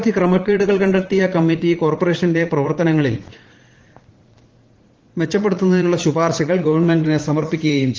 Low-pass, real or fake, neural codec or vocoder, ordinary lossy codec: 7.2 kHz; fake; vocoder, 44.1 kHz, 80 mel bands, Vocos; Opus, 16 kbps